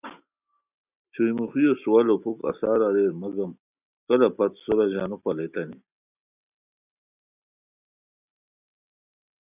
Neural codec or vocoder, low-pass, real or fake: none; 3.6 kHz; real